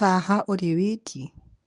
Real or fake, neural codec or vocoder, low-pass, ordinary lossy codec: fake; codec, 24 kHz, 0.9 kbps, WavTokenizer, medium speech release version 1; 10.8 kHz; none